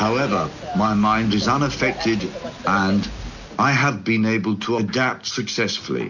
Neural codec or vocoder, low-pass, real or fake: none; 7.2 kHz; real